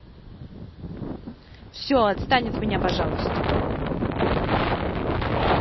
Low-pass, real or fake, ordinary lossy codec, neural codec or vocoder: 7.2 kHz; real; MP3, 24 kbps; none